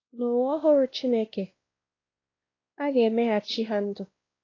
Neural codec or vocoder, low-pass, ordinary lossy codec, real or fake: codec, 16 kHz, 1 kbps, X-Codec, WavLM features, trained on Multilingual LibriSpeech; 7.2 kHz; AAC, 32 kbps; fake